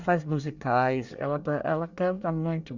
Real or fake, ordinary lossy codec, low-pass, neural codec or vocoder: fake; Opus, 64 kbps; 7.2 kHz; codec, 24 kHz, 1 kbps, SNAC